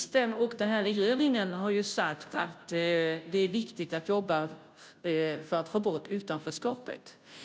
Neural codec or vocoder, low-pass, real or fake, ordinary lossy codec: codec, 16 kHz, 0.5 kbps, FunCodec, trained on Chinese and English, 25 frames a second; none; fake; none